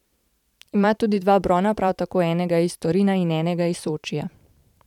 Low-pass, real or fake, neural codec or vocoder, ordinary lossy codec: 19.8 kHz; fake; vocoder, 44.1 kHz, 128 mel bands every 512 samples, BigVGAN v2; none